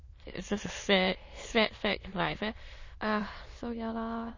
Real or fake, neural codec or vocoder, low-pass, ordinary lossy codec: fake; autoencoder, 22.05 kHz, a latent of 192 numbers a frame, VITS, trained on many speakers; 7.2 kHz; MP3, 32 kbps